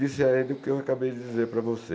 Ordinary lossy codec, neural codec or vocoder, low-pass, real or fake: none; none; none; real